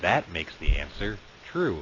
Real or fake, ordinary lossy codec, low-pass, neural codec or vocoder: real; AAC, 32 kbps; 7.2 kHz; none